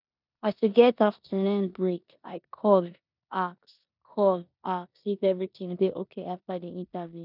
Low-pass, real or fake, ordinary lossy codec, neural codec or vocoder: 5.4 kHz; fake; none; codec, 16 kHz in and 24 kHz out, 0.9 kbps, LongCat-Audio-Codec, four codebook decoder